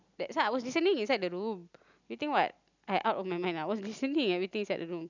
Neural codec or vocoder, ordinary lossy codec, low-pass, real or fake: none; none; 7.2 kHz; real